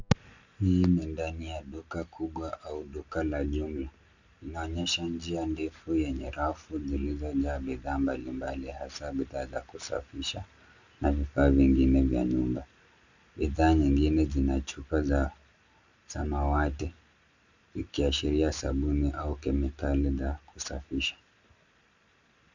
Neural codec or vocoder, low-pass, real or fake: none; 7.2 kHz; real